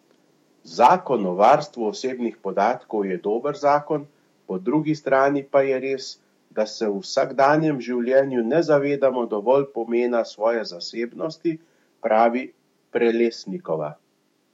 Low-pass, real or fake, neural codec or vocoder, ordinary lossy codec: 19.8 kHz; fake; autoencoder, 48 kHz, 128 numbers a frame, DAC-VAE, trained on Japanese speech; MP3, 64 kbps